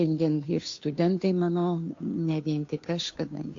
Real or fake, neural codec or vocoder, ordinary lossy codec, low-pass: fake; codec, 16 kHz, 2 kbps, FunCodec, trained on Chinese and English, 25 frames a second; AAC, 48 kbps; 7.2 kHz